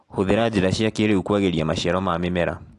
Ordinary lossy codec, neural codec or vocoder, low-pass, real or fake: AAC, 48 kbps; none; 10.8 kHz; real